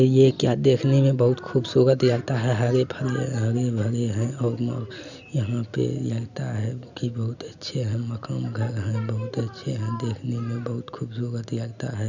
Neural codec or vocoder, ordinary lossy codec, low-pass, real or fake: none; none; 7.2 kHz; real